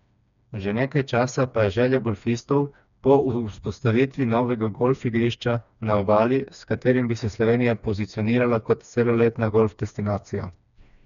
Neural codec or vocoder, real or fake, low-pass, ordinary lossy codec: codec, 16 kHz, 2 kbps, FreqCodec, smaller model; fake; 7.2 kHz; none